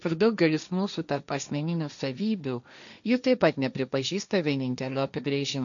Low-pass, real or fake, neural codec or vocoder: 7.2 kHz; fake; codec, 16 kHz, 1.1 kbps, Voila-Tokenizer